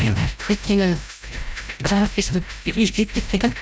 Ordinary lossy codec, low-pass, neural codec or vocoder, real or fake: none; none; codec, 16 kHz, 0.5 kbps, FreqCodec, larger model; fake